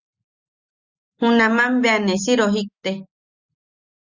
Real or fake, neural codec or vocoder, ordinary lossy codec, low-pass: real; none; Opus, 64 kbps; 7.2 kHz